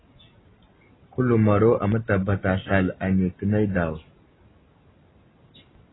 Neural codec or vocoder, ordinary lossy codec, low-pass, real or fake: none; AAC, 16 kbps; 7.2 kHz; real